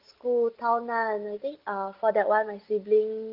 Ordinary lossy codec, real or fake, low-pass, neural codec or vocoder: Opus, 16 kbps; real; 5.4 kHz; none